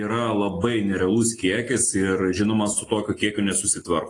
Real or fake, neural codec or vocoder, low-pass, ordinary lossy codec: real; none; 10.8 kHz; AAC, 32 kbps